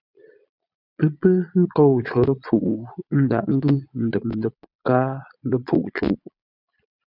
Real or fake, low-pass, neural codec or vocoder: fake; 5.4 kHz; vocoder, 22.05 kHz, 80 mel bands, Vocos